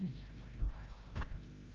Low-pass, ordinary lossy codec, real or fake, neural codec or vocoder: 7.2 kHz; Opus, 32 kbps; fake; codec, 16 kHz, 0.5 kbps, FreqCodec, larger model